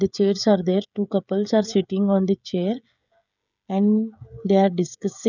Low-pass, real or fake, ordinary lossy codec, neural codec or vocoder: 7.2 kHz; fake; none; codec, 16 kHz, 16 kbps, FreqCodec, smaller model